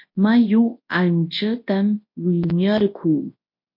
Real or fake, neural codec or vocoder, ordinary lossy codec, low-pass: fake; codec, 24 kHz, 0.9 kbps, WavTokenizer, large speech release; MP3, 32 kbps; 5.4 kHz